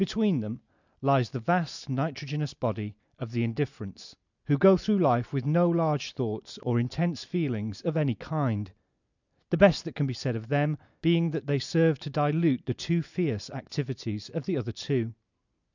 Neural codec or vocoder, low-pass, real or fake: none; 7.2 kHz; real